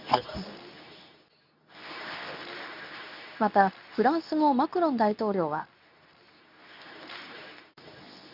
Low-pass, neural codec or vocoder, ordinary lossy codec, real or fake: 5.4 kHz; codec, 24 kHz, 0.9 kbps, WavTokenizer, medium speech release version 2; none; fake